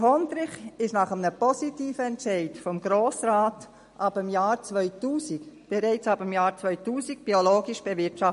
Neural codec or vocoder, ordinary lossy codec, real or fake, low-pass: none; MP3, 48 kbps; real; 10.8 kHz